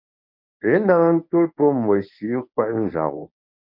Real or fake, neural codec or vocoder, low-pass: fake; codec, 24 kHz, 0.9 kbps, WavTokenizer, medium speech release version 1; 5.4 kHz